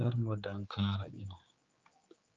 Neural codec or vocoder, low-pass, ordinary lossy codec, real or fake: codec, 16 kHz, 4 kbps, X-Codec, HuBERT features, trained on general audio; 7.2 kHz; Opus, 24 kbps; fake